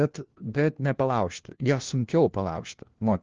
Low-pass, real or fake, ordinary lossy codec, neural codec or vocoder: 7.2 kHz; fake; Opus, 16 kbps; codec, 16 kHz, 1 kbps, FunCodec, trained on LibriTTS, 50 frames a second